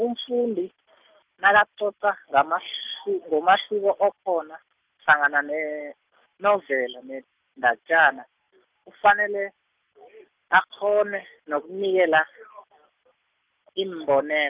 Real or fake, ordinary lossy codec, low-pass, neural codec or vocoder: real; Opus, 32 kbps; 3.6 kHz; none